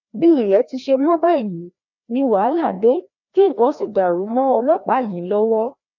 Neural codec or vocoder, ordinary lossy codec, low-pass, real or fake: codec, 16 kHz, 1 kbps, FreqCodec, larger model; none; 7.2 kHz; fake